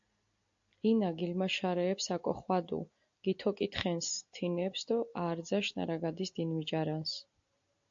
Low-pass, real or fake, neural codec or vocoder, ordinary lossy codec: 7.2 kHz; real; none; AAC, 64 kbps